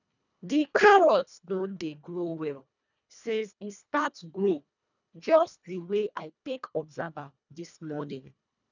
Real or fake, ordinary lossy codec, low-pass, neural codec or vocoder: fake; none; 7.2 kHz; codec, 24 kHz, 1.5 kbps, HILCodec